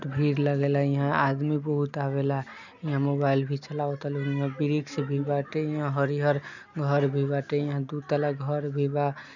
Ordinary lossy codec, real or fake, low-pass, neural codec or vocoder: none; real; 7.2 kHz; none